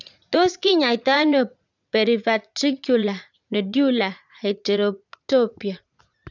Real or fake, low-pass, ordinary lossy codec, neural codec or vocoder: fake; 7.2 kHz; none; vocoder, 44.1 kHz, 80 mel bands, Vocos